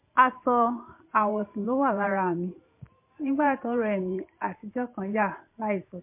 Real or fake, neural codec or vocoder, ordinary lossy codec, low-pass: fake; vocoder, 44.1 kHz, 128 mel bands every 512 samples, BigVGAN v2; MP3, 32 kbps; 3.6 kHz